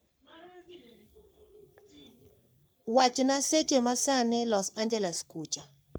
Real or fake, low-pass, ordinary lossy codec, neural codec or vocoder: fake; none; none; codec, 44.1 kHz, 3.4 kbps, Pupu-Codec